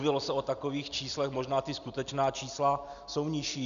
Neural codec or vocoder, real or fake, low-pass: none; real; 7.2 kHz